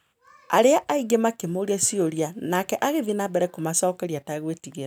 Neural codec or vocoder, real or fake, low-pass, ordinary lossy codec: none; real; none; none